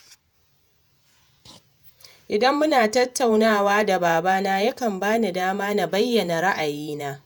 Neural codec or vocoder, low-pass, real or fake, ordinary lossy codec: vocoder, 48 kHz, 128 mel bands, Vocos; none; fake; none